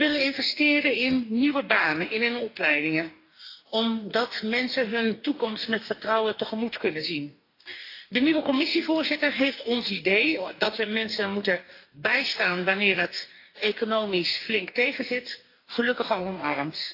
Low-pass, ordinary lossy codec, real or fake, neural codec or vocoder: 5.4 kHz; AAC, 32 kbps; fake; codec, 44.1 kHz, 2.6 kbps, DAC